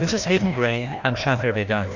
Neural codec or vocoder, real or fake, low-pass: codec, 16 kHz, 1 kbps, FreqCodec, larger model; fake; 7.2 kHz